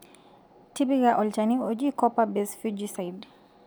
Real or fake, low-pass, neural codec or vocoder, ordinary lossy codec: real; none; none; none